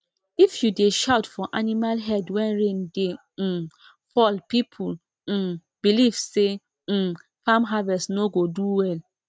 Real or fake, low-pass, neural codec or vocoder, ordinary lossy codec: real; none; none; none